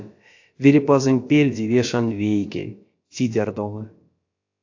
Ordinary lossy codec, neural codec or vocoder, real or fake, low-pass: AAC, 48 kbps; codec, 16 kHz, about 1 kbps, DyCAST, with the encoder's durations; fake; 7.2 kHz